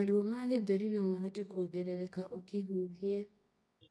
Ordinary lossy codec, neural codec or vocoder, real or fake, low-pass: none; codec, 24 kHz, 0.9 kbps, WavTokenizer, medium music audio release; fake; none